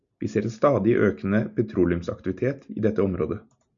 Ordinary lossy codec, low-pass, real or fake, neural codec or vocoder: MP3, 96 kbps; 7.2 kHz; real; none